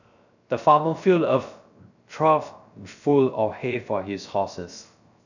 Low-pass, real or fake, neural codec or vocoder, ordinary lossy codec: 7.2 kHz; fake; codec, 16 kHz, 0.3 kbps, FocalCodec; none